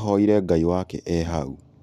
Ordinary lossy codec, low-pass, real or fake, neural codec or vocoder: none; 14.4 kHz; real; none